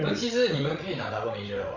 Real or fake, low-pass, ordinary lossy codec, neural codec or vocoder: fake; 7.2 kHz; none; codec, 16 kHz, 16 kbps, FreqCodec, larger model